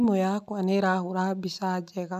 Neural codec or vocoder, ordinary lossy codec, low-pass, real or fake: none; none; 14.4 kHz; real